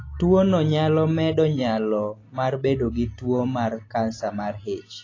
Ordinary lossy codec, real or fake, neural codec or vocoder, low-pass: AAC, 32 kbps; real; none; 7.2 kHz